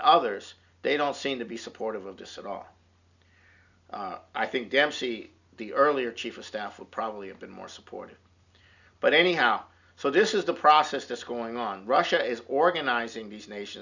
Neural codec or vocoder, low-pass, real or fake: none; 7.2 kHz; real